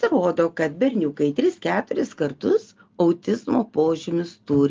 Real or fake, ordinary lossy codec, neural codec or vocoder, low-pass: real; Opus, 24 kbps; none; 7.2 kHz